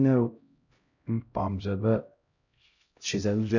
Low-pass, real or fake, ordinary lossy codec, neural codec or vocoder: 7.2 kHz; fake; none; codec, 16 kHz, 0.5 kbps, X-Codec, HuBERT features, trained on LibriSpeech